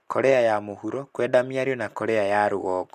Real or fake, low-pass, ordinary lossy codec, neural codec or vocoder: real; 14.4 kHz; MP3, 96 kbps; none